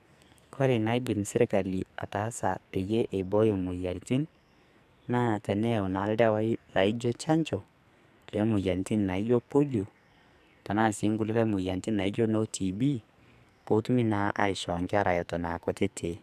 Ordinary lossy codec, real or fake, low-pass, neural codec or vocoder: none; fake; 14.4 kHz; codec, 44.1 kHz, 2.6 kbps, SNAC